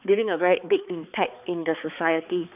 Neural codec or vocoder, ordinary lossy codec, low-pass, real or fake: codec, 16 kHz, 4 kbps, X-Codec, HuBERT features, trained on balanced general audio; none; 3.6 kHz; fake